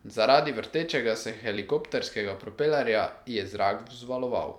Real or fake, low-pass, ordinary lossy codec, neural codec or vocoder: fake; 19.8 kHz; none; vocoder, 44.1 kHz, 128 mel bands every 256 samples, BigVGAN v2